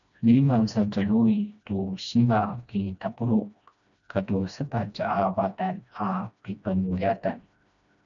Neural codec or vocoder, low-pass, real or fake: codec, 16 kHz, 1 kbps, FreqCodec, smaller model; 7.2 kHz; fake